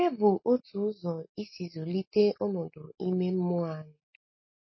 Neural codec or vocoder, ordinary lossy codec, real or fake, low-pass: none; MP3, 24 kbps; real; 7.2 kHz